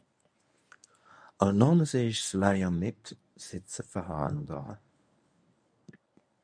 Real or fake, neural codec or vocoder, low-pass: fake; codec, 24 kHz, 0.9 kbps, WavTokenizer, medium speech release version 1; 9.9 kHz